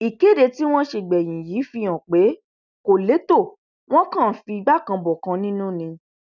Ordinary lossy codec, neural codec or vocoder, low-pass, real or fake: none; none; 7.2 kHz; real